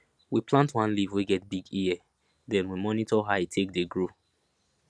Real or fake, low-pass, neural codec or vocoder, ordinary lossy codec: real; none; none; none